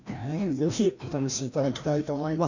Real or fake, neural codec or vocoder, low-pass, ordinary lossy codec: fake; codec, 16 kHz, 1 kbps, FreqCodec, larger model; 7.2 kHz; none